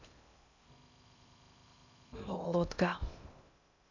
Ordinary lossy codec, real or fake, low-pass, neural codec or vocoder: none; fake; 7.2 kHz; codec, 16 kHz in and 24 kHz out, 0.6 kbps, FocalCodec, streaming, 2048 codes